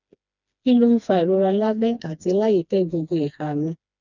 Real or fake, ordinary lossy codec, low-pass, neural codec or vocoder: fake; none; 7.2 kHz; codec, 16 kHz, 2 kbps, FreqCodec, smaller model